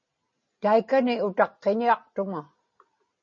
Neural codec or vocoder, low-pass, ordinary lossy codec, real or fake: none; 7.2 kHz; MP3, 32 kbps; real